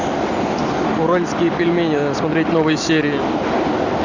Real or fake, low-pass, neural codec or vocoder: real; 7.2 kHz; none